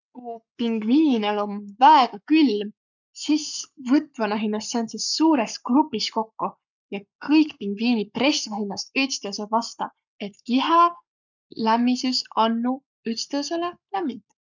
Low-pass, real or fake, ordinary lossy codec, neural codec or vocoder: 7.2 kHz; fake; none; codec, 44.1 kHz, 7.8 kbps, Pupu-Codec